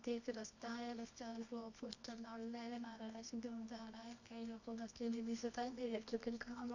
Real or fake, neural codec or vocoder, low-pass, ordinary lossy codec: fake; codec, 24 kHz, 0.9 kbps, WavTokenizer, medium music audio release; 7.2 kHz; none